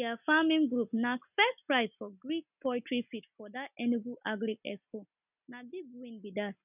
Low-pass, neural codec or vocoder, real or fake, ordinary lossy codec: 3.6 kHz; none; real; none